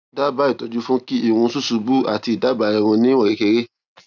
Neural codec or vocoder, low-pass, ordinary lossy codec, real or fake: none; 7.2 kHz; none; real